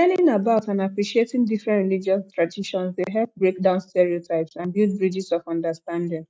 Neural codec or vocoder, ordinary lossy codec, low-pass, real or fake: none; none; none; real